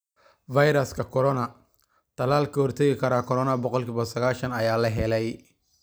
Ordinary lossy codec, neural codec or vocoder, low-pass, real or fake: none; none; none; real